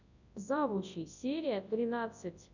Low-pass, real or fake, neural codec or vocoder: 7.2 kHz; fake; codec, 24 kHz, 0.9 kbps, WavTokenizer, large speech release